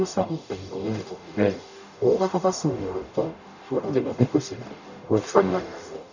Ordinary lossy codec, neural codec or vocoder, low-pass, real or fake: none; codec, 44.1 kHz, 0.9 kbps, DAC; 7.2 kHz; fake